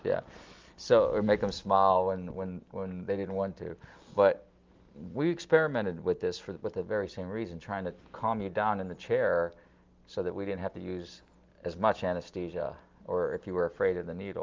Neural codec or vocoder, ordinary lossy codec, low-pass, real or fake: none; Opus, 32 kbps; 7.2 kHz; real